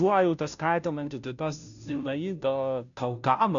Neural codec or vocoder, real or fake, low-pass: codec, 16 kHz, 0.5 kbps, FunCodec, trained on Chinese and English, 25 frames a second; fake; 7.2 kHz